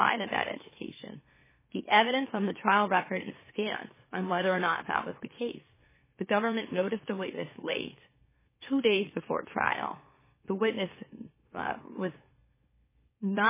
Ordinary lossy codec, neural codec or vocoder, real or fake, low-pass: MP3, 16 kbps; autoencoder, 44.1 kHz, a latent of 192 numbers a frame, MeloTTS; fake; 3.6 kHz